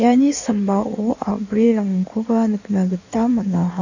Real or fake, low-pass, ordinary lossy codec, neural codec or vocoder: fake; 7.2 kHz; none; codec, 16 kHz in and 24 kHz out, 1.1 kbps, FireRedTTS-2 codec